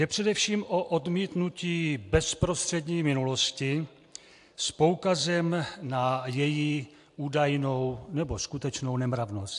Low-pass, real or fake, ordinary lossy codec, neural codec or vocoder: 10.8 kHz; real; AAC, 64 kbps; none